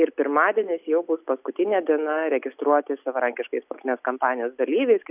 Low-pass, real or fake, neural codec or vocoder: 3.6 kHz; real; none